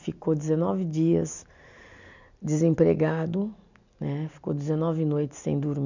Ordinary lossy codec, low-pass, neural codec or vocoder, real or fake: none; 7.2 kHz; none; real